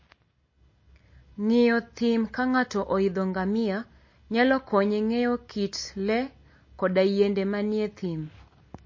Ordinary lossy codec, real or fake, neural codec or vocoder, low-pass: MP3, 32 kbps; real; none; 7.2 kHz